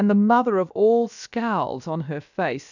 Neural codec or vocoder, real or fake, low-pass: codec, 16 kHz, about 1 kbps, DyCAST, with the encoder's durations; fake; 7.2 kHz